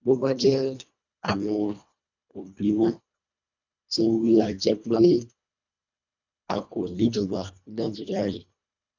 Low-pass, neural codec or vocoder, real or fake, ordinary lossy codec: 7.2 kHz; codec, 24 kHz, 1.5 kbps, HILCodec; fake; none